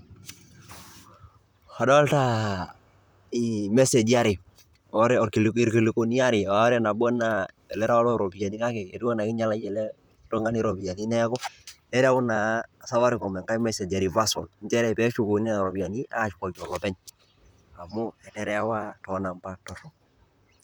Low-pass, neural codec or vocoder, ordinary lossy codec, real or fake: none; vocoder, 44.1 kHz, 128 mel bands, Pupu-Vocoder; none; fake